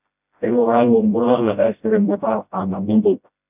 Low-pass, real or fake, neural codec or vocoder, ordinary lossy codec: 3.6 kHz; fake; codec, 16 kHz, 0.5 kbps, FreqCodec, smaller model; none